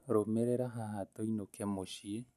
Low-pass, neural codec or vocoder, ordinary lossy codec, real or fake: 14.4 kHz; none; none; real